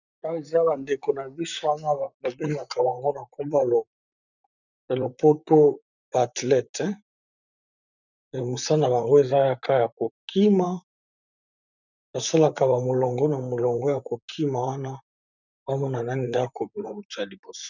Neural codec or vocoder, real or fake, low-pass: vocoder, 44.1 kHz, 128 mel bands, Pupu-Vocoder; fake; 7.2 kHz